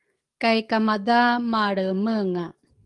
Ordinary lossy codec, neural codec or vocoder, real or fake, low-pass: Opus, 24 kbps; codec, 44.1 kHz, 7.8 kbps, DAC; fake; 10.8 kHz